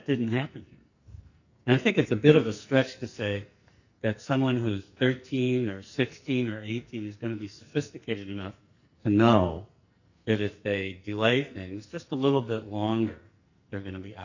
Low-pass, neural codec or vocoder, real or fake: 7.2 kHz; codec, 44.1 kHz, 2.6 kbps, SNAC; fake